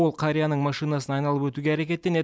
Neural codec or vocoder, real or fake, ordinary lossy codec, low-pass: none; real; none; none